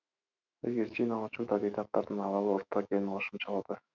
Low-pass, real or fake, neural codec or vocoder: 7.2 kHz; fake; autoencoder, 48 kHz, 128 numbers a frame, DAC-VAE, trained on Japanese speech